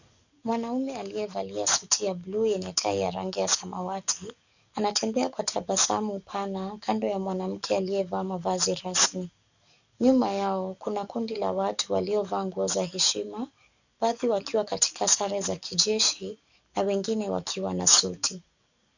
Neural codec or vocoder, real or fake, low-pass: codec, 44.1 kHz, 7.8 kbps, DAC; fake; 7.2 kHz